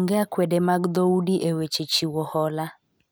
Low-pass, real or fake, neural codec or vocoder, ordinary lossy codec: none; real; none; none